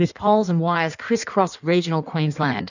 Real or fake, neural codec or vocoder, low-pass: fake; codec, 16 kHz in and 24 kHz out, 1.1 kbps, FireRedTTS-2 codec; 7.2 kHz